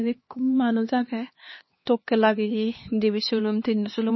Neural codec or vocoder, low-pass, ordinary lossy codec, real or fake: codec, 16 kHz, 2 kbps, X-Codec, HuBERT features, trained on LibriSpeech; 7.2 kHz; MP3, 24 kbps; fake